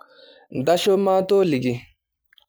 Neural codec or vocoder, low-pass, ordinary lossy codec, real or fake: none; none; none; real